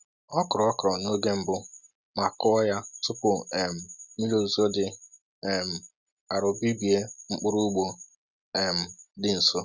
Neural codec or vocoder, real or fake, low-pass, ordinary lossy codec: none; real; 7.2 kHz; Opus, 64 kbps